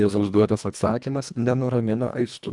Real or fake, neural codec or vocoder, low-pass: fake; codec, 24 kHz, 1.5 kbps, HILCodec; 10.8 kHz